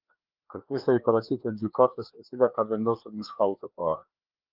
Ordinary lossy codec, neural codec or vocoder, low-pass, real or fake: Opus, 32 kbps; codec, 16 kHz, 2 kbps, FreqCodec, larger model; 5.4 kHz; fake